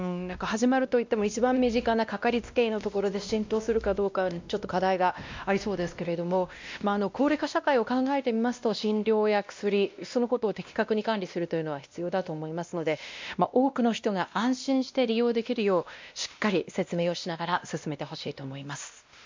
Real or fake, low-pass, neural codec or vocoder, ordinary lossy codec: fake; 7.2 kHz; codec, 16 kHz, 1 kbps, X-Codec, WavLM features, trained on Multilingual LibriSpeech; MP3, 64 kbps